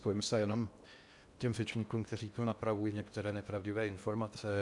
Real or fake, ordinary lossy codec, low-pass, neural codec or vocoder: fake; MP3, 96 kbps; 10.8 kHz; codec, 16 kHz in and 24 kHz out, 0.8 kbps, FocalCodec, streaming, 65536 codes